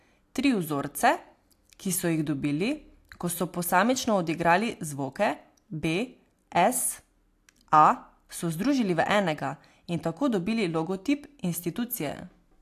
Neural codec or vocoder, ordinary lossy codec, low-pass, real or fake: none; AAC, 64 kbps; 14.4 kHz; real